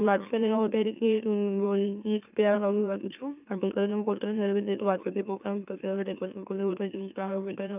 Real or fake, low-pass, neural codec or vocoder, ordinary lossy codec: fake; 3.6 kHz; autoencoder, 44.1 kHz, a latent of 192 numbers a frame, MeloTTS; none